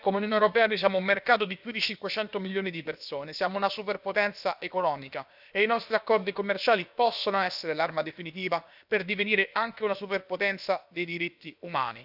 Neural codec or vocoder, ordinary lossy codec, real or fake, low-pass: codec, 16 kHz, about 1 kbps, DyCAST, with the encoder's durations; none; fake; 5.4 kHz